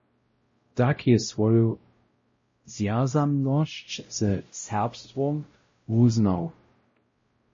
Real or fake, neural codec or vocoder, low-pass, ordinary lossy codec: fake; codec, 16 kHz, 0.5 kbps, X-Codec, WavLM features, trained on Multilingual LibriSpeech; 7.2 kHz; MP3, 32 kbps